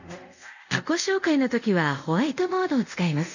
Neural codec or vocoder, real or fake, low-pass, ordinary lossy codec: codec, 24 kHz, 0.5 kbps, DualCodec; fake; 7.2 kHz; none